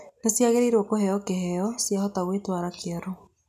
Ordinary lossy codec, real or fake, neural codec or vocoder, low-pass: none; real; none; 14.4 kHz